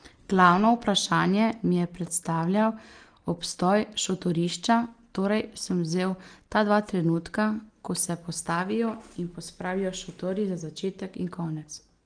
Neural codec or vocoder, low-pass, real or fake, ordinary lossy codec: none; 9.9 kHz; real; Opus, 24 kbps